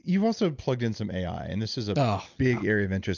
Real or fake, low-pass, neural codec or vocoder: real; 7.2 kHz; none